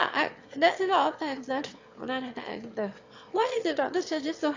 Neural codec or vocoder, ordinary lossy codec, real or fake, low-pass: autoencoder, 22.05 kHz, a latent of 192 numbers a frame, VITS, trained on one speaker; AAC, 48 kbps; fake; 7.2 kHz